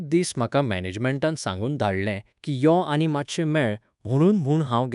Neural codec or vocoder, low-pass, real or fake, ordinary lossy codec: codec, 24 kHz, 1.2 kbps, DualCodec; 10.8 kHz; fake; none